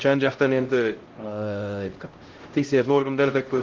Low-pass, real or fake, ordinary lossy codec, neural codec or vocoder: 7.2 kHz; fake; Opus, 16 kbps; codec, 16 kHz, 0.5 kbps, X-Codec, HuBERT features, trained on LibriSpeech